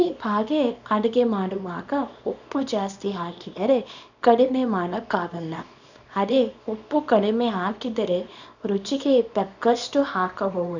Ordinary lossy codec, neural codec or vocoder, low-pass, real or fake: none; codec, 24 kHz, 0.9 kbps, WavTokenizer, small release; 7.2 kHz; fake